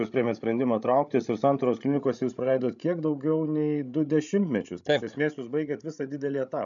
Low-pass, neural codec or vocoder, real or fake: 7.2 kHz; codec, 16 kHz, 16 kbps, FreqCodec, larger model; fake